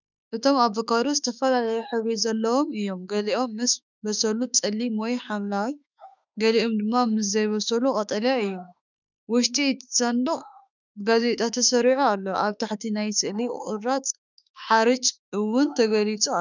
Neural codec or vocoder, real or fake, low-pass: autoencoder, 48 kHz, 32 numbers a frame, DAC-VAE, trained on Japanese speech; fake; 7.2 kHz